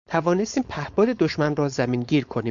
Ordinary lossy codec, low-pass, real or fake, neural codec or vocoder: Opus, 64 kbps; 7.2 kHz; fake; codec, 16 kHz, 4.8 kbps, FACodec